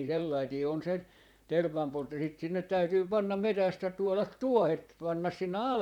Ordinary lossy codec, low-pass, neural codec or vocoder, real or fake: none; 19.8 kHz; vocoder, 44.1 kHz, 128 mel bands, Pupu-Vocoder; fake